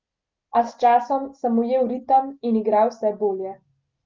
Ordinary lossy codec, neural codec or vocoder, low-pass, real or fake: Opus, 32 kbps; none; 7.2 kHz; real